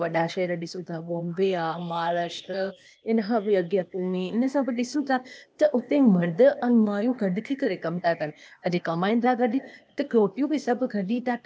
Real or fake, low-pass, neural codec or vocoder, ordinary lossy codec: fake; none; codec, 16 kHz, 0.8 kbps, ZipCodec; none